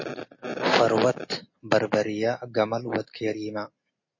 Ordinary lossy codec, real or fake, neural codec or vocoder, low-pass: MP3, 32 kbps; real; none; 7.2 kHz